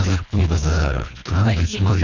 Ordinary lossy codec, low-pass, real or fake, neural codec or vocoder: none; 7.2 kHz; fake; codec, 24 kHz, 1.5 kbps, HILCodec